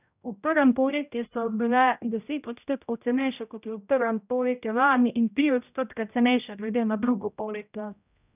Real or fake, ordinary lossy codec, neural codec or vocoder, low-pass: fake; none; codec, 16 kHz, 0.5 kbps, X-Codec, HuBERT features, trained on general audio; 3.6 kHz